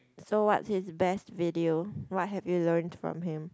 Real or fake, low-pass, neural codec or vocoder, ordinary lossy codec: real; none; none; none